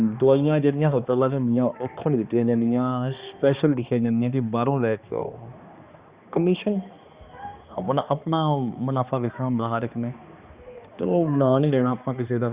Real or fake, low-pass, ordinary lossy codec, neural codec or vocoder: fake; 3.6 kHz; Opus, 64 kbps; codec, 16 kHz, 2 kbps, X-Codec, HuBERT features, trained on balanced general audio